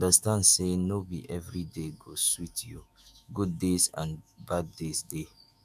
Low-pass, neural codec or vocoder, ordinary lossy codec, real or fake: 14.4 kHz; autoencoder, 48 kHz, 128 numbers a frame, DAC-VAE, trained on Japanese speech; none; fake